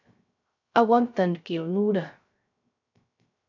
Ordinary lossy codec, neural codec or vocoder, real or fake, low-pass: MP3, 64 kbps; codec, 16 kHz, 0.3 kbps, FocalCodec; fake; 7.2 kHz